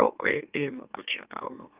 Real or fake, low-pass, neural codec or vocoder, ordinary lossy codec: fake; 3.6 kHz; autoencoder, 44.1 kHz, a latent of 192 numbers a frame, MeloTTS; Opus, 32 kbps